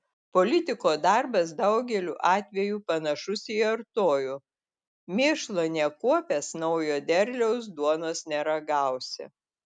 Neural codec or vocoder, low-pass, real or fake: none; 9.9 kHz; real